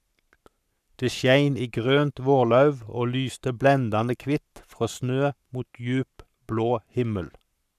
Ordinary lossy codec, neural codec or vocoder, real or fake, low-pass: none; codec, 44.1 kHz, 7.8 kbps, Pupu-Codec; fake; 14.4 kHz